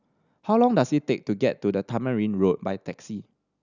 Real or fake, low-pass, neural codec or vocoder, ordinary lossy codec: real; 7.2 kHz; none; none